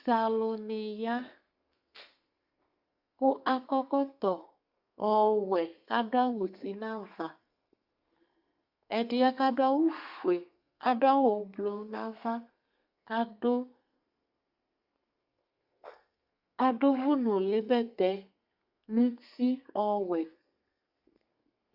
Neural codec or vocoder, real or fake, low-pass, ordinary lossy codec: codec, 32 kHz, 1.9 kbps, SNAC; fake; 5.4 kHz; Opus, 64 kbps